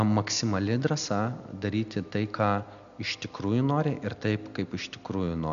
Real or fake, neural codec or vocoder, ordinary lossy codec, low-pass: real; none; MP3, 96 kbps; 7.2 kHz